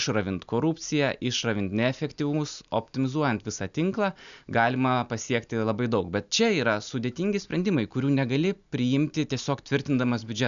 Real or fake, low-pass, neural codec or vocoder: real; 7.2 kHz; none